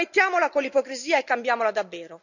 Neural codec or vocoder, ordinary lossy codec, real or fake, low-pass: none; none; real; 7.2 kHz